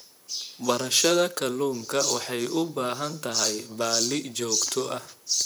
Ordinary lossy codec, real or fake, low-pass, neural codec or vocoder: none; fake; none; vocoder, 44.1 kHz, 128 mel bands, Pupu-Vocoder